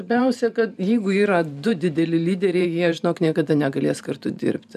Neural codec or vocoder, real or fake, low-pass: vocoder, 44.1 kHz, 128 mel bands every 512 samples, BigVGAN v2; fake; 14.4 kHz